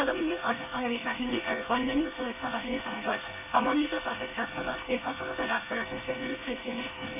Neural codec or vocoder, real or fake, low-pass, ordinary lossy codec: codec, 24 kHz, 1 kbps, SNAC; fake; 3.6 kHz; none